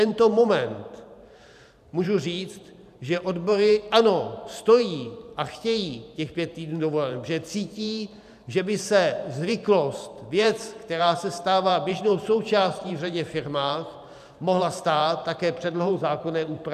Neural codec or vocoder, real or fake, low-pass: vocoder, 44.1 kHz, 128 mel bands every 512 samples, BigVGAN v2; fake; 14.4 kHz